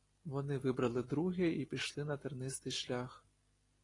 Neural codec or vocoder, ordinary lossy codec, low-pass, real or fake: none; AAC, 32 kbps; 10.8 kHz; real